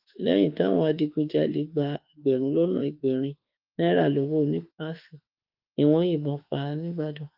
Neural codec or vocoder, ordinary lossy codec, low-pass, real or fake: autoencoder, 48 kHz, 32 numbers a frame, DAC-VAE, trained on Japanese speech; Opus, 32 kbps; 5.4 kHz; fake